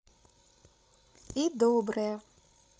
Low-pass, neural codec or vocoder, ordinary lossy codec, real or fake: none; codec, 16 kHz, 16 kbps, FreqCodec, larger model; none; fake